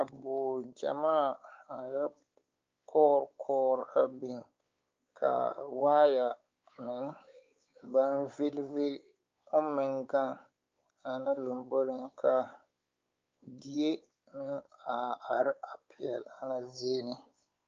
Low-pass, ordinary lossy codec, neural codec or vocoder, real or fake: 7.2 kHz; Opus, 24 kbps; codec, 16 kHz, 4 kbps, X-Codec, WavLM features, trained on Multilingual LibriSpeech; fake